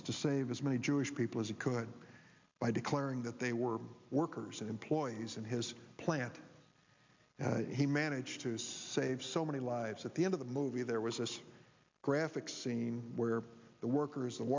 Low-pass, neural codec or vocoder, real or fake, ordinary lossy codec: 7.2 kHz; none; real; MP3, 64 kbps